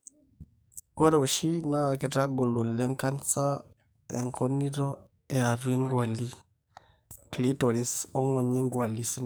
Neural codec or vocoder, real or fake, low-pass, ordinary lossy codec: codec, 44.1 kHz, 2.6 kbps, SNAC; fake; none; none